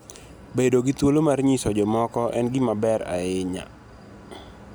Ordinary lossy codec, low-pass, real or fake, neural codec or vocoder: none; none; real; none